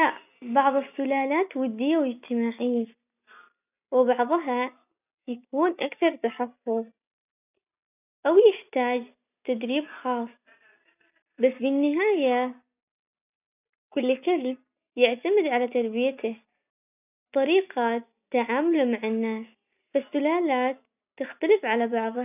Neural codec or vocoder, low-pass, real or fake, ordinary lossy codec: none; 3.6 kHz; real; none